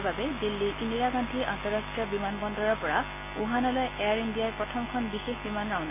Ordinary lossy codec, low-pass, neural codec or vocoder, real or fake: none; 3.6 kHz; none; real